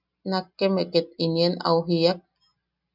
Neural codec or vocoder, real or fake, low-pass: none; real; 5.4 kHz